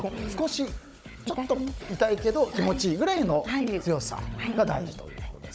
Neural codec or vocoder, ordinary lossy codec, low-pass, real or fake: codec, 16 kHz, 16 kbps, FunCodec, trained on Chinese and English, 50 frames a second; none; none; fake